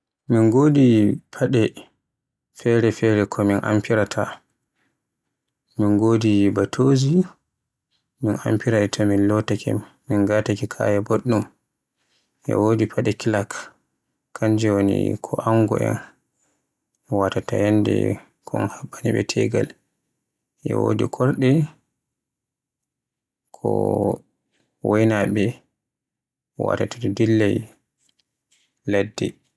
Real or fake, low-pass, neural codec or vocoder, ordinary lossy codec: real; none; none; none